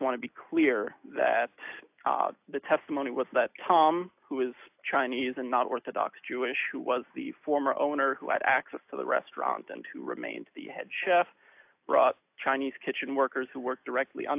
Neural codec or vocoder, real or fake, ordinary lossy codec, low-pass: none; real; AAC, 32 kbps; 3.6 kHz